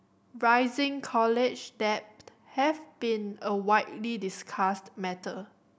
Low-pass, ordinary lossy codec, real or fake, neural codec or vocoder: none; none; real; none